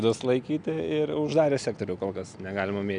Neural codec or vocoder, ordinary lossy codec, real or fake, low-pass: none; MP3, 96 kbps; real; 9.9 kHz